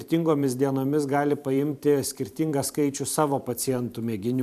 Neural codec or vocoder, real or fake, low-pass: vocoder, 44.1 kHz, 128 mel bands every 512 samples, BigVGAN v2; fake; 14.4 kHz